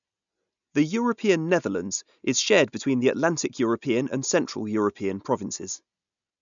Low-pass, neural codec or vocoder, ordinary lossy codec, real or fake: 7.2 kHz; none; none; real